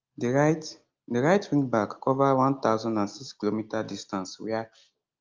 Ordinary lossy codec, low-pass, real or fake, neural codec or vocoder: Opus, 24 kbps; 7.2 kHz; real; none